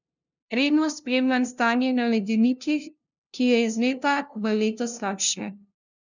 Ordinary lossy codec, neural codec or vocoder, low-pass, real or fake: none; codec, 16 kHz, 0.5 kbps, FunCodec, trained on LibriTTS, 25 frames a second; 7.2 kHz; fake